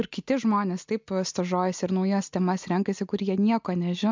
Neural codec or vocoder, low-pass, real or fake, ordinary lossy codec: none; 7.2 kHz; real; MP3, 64 kbps